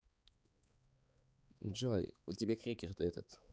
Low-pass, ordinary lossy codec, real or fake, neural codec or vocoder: none; none; fake; codec, 16 kHz, 4 kbps, X-Codec, HuBERT features, trained on balanced general audio